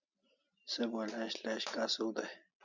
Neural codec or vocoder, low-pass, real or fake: none; 7.2 kHz; real